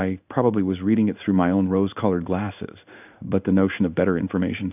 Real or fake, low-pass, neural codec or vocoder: fake; 3.6 kHz; codec, 16 kHz in and 24 kHz out, 1 kbps, XY-Tokenizer